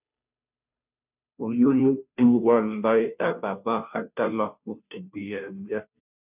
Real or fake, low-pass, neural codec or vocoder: fake; 3.6 kHz; codec, 16 kHz, 0.5 kbps, FunCodec, trained on Chinese and English, 25 frames a second